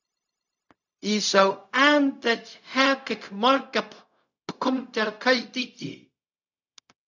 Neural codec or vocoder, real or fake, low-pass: codec, 16 kHz, 0.4 kbps, LongCat-Audio-Codec; fake; 7.2 kHz